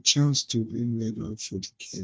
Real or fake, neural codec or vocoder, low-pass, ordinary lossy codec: fake; codec, 16 kHz, 1 kbps, FunCodec, trained on Chinese and English, 50 frames a second; none; none